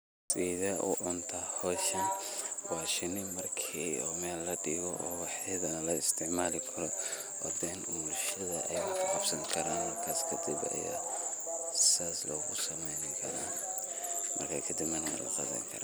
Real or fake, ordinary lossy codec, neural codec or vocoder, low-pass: real; none; none; none